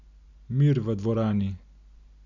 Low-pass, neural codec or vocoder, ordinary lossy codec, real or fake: 7.2 kHz; none; none; real